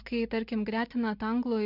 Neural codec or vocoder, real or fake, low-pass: none; real; 5.4 kHz